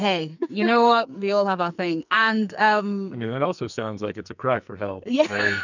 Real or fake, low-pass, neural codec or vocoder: fake; 7.2 kHz; codec, 44.1 kHz, 2.6 kbps, SNAC